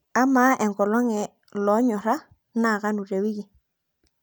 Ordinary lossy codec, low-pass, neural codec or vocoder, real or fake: none; none; none; real